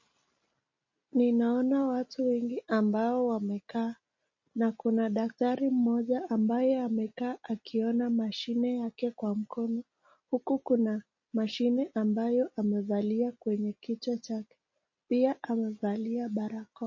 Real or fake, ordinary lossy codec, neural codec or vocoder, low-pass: real; MP3, 32 kbps; none; 7.2 kHz